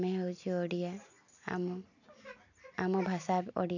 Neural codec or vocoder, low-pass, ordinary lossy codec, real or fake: none; 7.2 kHz; none; real